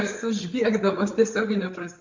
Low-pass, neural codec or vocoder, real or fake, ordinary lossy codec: 7.2 kHz; codec, 16 kHz, 8 kbps, FreqCodec, larger model; fake; MP3, 64 kbps